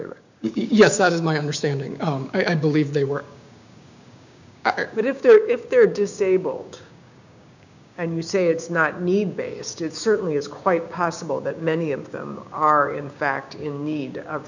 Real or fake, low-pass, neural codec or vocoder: fake; 7.2 kHz; codec, 16 kHz, 6 kbps, DAC